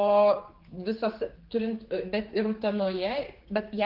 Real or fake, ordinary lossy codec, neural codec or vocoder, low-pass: fake; Opus, 16 kbps; codec, 16 kHz, 4 kbps, X-Codec, HuBERT features, trained on general audio; 5.4 kHz